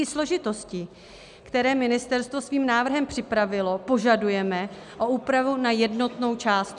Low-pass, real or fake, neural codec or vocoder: 10.8 kHz; real; none